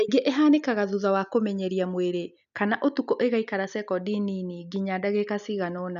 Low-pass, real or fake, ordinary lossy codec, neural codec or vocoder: 7.2 kHz; real; none; none